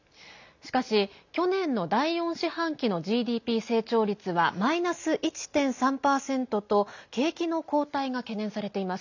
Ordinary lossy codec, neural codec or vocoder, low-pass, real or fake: none; none; 7.2 kHz; real